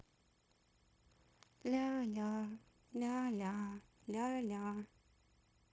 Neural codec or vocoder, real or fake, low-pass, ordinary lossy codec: codec, 16 kHz, 0.9 kbps, LongCat-Audio-Codec; fake; none; none